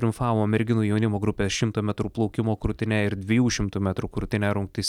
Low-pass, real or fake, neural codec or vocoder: 19.8 kHz; real; none